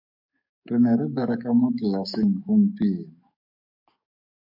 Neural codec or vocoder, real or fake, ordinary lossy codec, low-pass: codec, 44.1 kHz, 7.8 kbps, DAC; fake; MP3, 48 kbps; 5.4 kHz